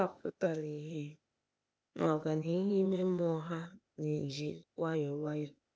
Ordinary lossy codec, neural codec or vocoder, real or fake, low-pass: none; codec, 16 kHz, 0.8 kbps, ZipCodec; fake; none